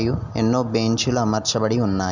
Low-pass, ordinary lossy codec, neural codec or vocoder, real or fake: 7.2 kHz; none; none; real